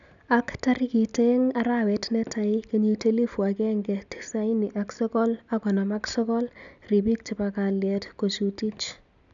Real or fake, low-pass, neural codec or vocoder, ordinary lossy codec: real; 7.2 kHz; none; none